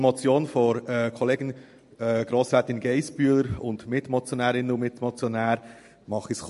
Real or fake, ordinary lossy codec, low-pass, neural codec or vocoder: real; MP3, 48 kbps; 14.4 kHz; none